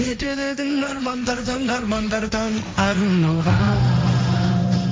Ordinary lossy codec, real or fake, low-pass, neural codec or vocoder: none; fake; none; codec, 16 kHz, 1.1 kbps, Voila-Tokenizer